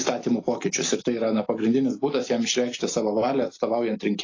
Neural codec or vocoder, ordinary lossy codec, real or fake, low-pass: none; AAC, 32 kbps; real; 7.2 kHz